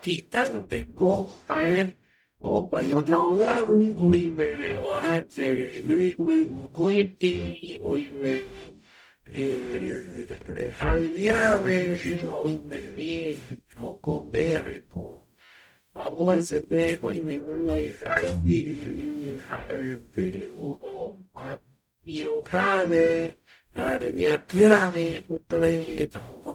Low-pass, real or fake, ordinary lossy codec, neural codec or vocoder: 19.8 kHz; fake; none; codec, 44.1 kHz, 0.9 kbps, DAC